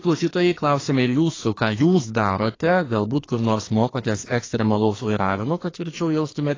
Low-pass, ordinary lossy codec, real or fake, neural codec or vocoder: 7.2 kHz; AAC, 32 kbps; fake; codec, 44.1 kHz, 2.6 kbps, SNAC